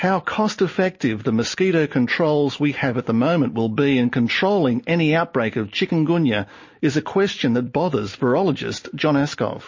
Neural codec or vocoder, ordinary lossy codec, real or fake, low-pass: none; MP3, 32 kbps; real; 7.2 kHz